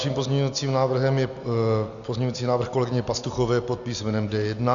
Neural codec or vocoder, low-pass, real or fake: none; 7.2 kHz; real